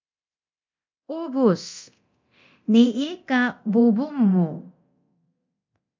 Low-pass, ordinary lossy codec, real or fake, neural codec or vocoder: 7.2 kHz; MP3, 48 kbps; fake; codec, 24 kHz, 0.9 kbps, DualCodec